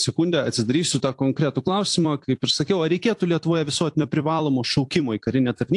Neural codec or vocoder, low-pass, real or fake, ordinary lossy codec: vocoder, 44.1 kHz, 128 mel bands every 256 samples, BigVGAN v2; 10.8 kHz; fake; AAC, 64 kbps